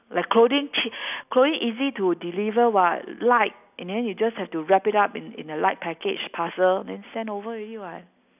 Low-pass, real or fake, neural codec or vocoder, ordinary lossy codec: 3.6 kHz; real; none; none